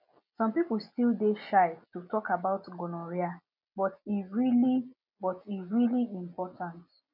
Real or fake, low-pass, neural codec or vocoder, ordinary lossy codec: real; 5.4 kHz; none; none